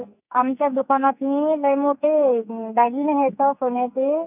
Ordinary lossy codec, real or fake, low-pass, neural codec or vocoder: none; fake; 3.6 kHz; codec, 44.1 kHz, 2.6 kbps, SNAC